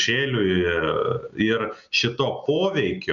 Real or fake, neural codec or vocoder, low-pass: real; none; 7.2 kHz